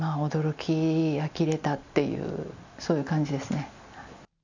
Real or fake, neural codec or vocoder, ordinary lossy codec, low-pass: real; none; none; 7.2 kHz